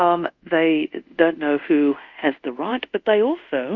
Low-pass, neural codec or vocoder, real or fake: 7.2 kHz; codec, 24 kHz, 0.5 kbps, DualCodec; fake